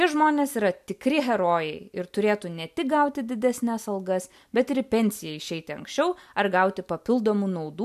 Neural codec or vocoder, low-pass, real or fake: none; 14.4 kHz; real